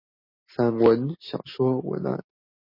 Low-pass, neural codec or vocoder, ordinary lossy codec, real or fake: 5.4 kHz; none; MP3, 24 kbps; real